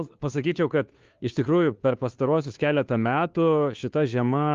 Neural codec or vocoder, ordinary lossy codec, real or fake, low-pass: codec, 16 kHz, 2 kbps, FunCodec, trained on Chinese and English, 25 frames a second; Opus, 32 kbps; fake; 7.2 kHz